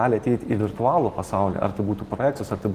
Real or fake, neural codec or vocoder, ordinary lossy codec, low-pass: real; none; Opus, 16 kbps; 14.4 kHz